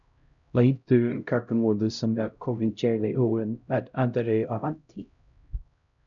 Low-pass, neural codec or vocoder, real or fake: 7.2 kHz; codec, 16 kHz, 0.5 kbps, X-Codec, HuBERT features, trained on LibriSpeech; fake